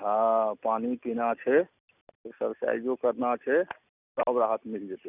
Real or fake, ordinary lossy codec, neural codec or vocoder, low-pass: real; none; none; 3.6 kHz